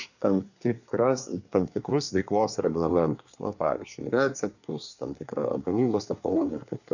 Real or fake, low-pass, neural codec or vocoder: fake; 7.2 kHz; codec, 24 kHz, 1 kbps, SNAC